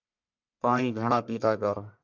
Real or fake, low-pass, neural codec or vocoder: fake; 7.2 kHz; codec, 44.1 kHz, 1.7 kbps, Pupu-Codec